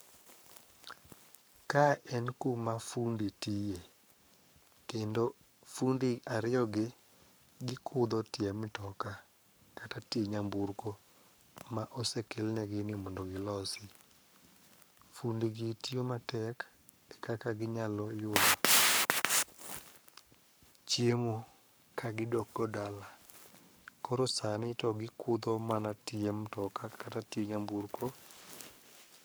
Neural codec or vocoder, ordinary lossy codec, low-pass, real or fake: codec, 44.1 kHz, 7.8 kbps, Pupu-Codec; none; none; fake